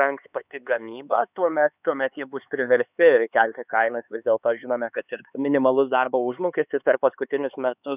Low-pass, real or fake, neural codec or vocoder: 3.6 kHz; fake; codec, 16 kHz, 4 kbps, X-Codec, HuBERT features, trained on LibriSpeech